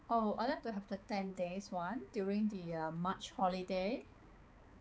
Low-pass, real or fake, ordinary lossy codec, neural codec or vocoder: none; fake; none; codec, 16 kHz, 4 kbps, X-Codec, HuBERT features, trained on balanced general audio